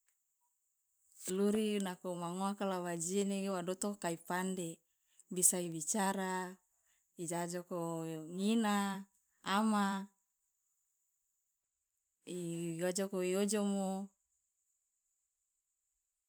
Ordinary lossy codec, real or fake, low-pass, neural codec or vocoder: none; fake; none; vocoder, 44.1 kHz, 128 mel bands every 512 samples, BigVGAN v2